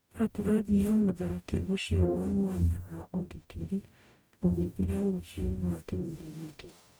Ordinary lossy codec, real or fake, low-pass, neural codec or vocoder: none; fake; none; codec, 44.1 kHz, 0.9 kbps, DAC